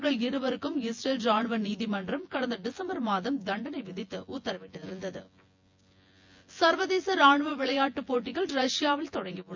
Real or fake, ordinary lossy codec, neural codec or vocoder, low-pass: fake; MP3, 48 kbps; vocoder, 24 kHz, 100 mel bands, Vocos; 7.2 kHz